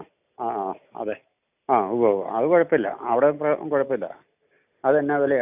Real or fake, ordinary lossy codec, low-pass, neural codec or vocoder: real; none; 3.6 kHz; none